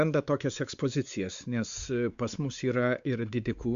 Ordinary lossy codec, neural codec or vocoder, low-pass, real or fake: Opus, 64 kbps; codec, 16 kHz, 4 kbps, X-Codec, WavLM features, trained on Multilingual LibriSpeech; 7.2 kHz; fake